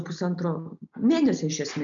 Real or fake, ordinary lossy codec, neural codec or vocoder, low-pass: real; MP3, 64 kbps; none; 7.2 kHz